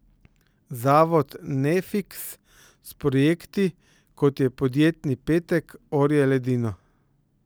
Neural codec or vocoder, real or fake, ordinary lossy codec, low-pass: none; real; none; none